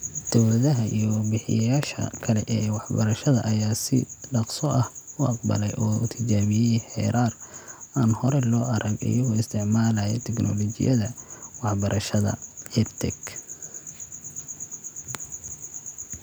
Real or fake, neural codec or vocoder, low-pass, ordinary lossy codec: real; none; none; none